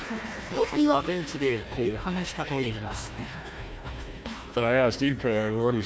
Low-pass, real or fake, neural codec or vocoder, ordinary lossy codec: none; fake; codec, 16 kHz, 1 kbps, FunCodec, trained on Chinese and English, 50 frames a second; none